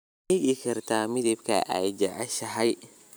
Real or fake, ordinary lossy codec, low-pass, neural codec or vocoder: fake; none; none; vocoder, 44.1 kHz, 128 mel bands every 256 samples, BigVGAN v2